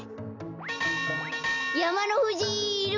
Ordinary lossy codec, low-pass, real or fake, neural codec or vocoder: none; 7.2 kHz; real; none